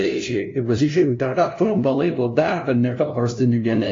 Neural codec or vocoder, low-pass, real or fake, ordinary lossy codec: codec, 16 kHz, 0.5 kbps, FunCodec, trained on LibriTTS, 25 frames a second; 7.2 kHz; fake; AAC, 48 kbps